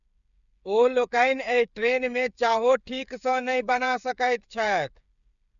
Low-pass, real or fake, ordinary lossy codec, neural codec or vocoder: 7.2 kHz; fake; none; codec, 16 kHz, 8 kbps, FreqCodec, smaller model